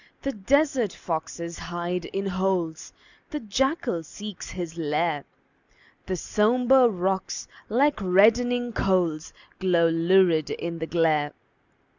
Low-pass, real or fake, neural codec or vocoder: 7.2 kHz; real; none